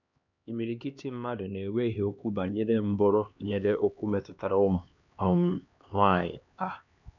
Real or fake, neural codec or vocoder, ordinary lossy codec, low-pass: fake; codec, 16 kHz, 2 kbps, X-Codec, HuBERT features, trained on LibriSpeech; none; 7.2 kHz